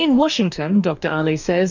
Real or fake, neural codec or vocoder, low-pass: fake; codec, 44.1 kHz, 2.6 kbps, DAC; 7.2 kHz